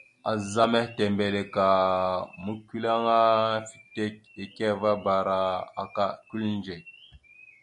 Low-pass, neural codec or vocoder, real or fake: 10.8 kHz; none; real